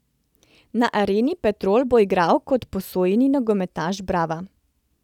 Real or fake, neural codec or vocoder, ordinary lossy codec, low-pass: real; none; none; 19.8 kHz